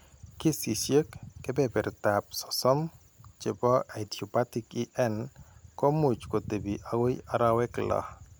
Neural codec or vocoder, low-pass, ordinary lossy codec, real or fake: none; none; none; real